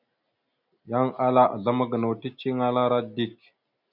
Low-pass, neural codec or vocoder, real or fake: 5.4 kHz; none; real